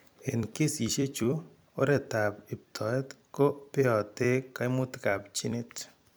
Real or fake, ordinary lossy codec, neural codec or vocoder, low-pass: fake; none; vocoder, 44.1 kHz, 128 mel bands every 256 samples, BigVGAN v2; none